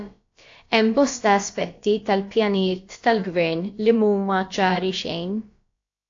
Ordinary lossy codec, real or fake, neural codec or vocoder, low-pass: AAC, 48 kbps; fake; codec, 16 kHz, about 1 kbps, DyCAST, with the encoder's durations; 7.2 kHz